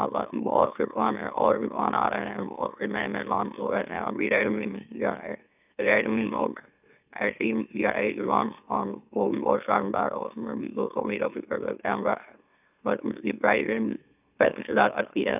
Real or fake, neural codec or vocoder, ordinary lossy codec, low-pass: fake; autoencoder, 44.1 kHz, a latent of 192 numbers a frame, MeloTTS; none; 3.6 kHz